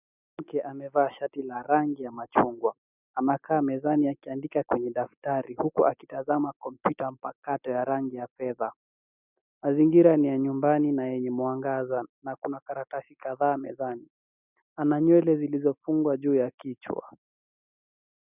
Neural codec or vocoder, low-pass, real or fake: none; 3.6 kHz; real